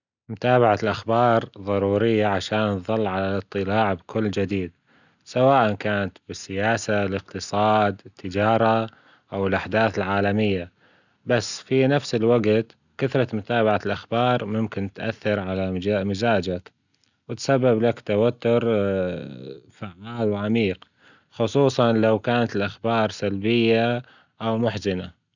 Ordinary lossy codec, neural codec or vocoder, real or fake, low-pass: none; none; real; 7.2 kHz